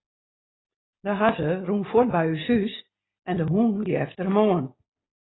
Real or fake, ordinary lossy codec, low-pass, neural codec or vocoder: real; AAC, 16 kbps; 7.2 kHz; none